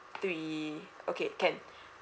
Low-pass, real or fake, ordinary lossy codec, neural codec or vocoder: none; real; none; none